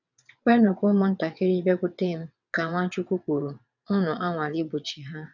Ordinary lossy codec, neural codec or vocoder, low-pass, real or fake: none; vocoder, 22.05 kHz, 80 mel bands, WaveNeXt; 7.2 kHz; fake